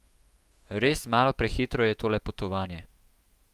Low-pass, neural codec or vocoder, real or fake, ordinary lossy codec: 14.4 kHz; autoencoder, 48 kHz, 128 numbers a frame, DAC-VAE, trained on Japanese speech; fake; Opus, 32 kbps